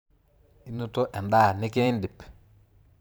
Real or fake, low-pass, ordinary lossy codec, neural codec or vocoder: fake; none; none; vocoder, 44.1 kHz, 128 mel bands every 512 samples, BigVGAN v2